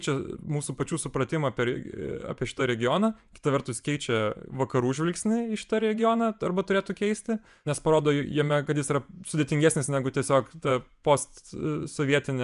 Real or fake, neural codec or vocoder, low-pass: fake; vocoder, 24 kHz, 100 mel bands, Vocos; 10.8 kHz